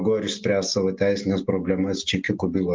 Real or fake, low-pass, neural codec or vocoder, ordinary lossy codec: real; 7.2 kHz; none; Opus, 32 kbps